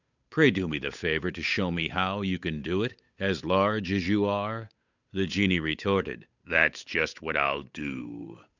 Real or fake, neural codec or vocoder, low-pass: fake; codec, 16 kHz, 8 kbps, FunCodec, trained on Chinese and English, 25 frames a second; 7.2 kHz